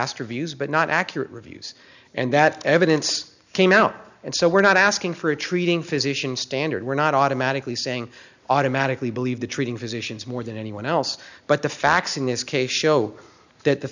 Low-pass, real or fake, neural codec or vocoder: 7.2 kHz; real; none